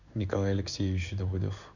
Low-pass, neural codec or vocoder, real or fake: 7.2 kHz; codec, 16 kHz in and 24 kHz out, 1 kbps, XY-Tokenizer; fake